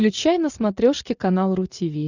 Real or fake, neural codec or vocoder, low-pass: real; none; 7.2 kHz